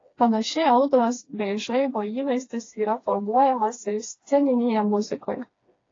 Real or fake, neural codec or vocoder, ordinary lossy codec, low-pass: fake; codec, 16 kHz, 2 kbps, FreqCodec, smaller model; AAC, 32 kbps; 7.2 kHz